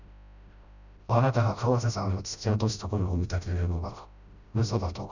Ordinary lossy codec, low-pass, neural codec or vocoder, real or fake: none; 7.2 kHz; codec, 16 kHz, 0.5 kbps, FreqCodec, smaller model; fake